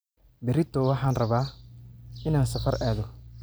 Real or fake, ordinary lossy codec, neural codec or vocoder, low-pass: real; none; none; none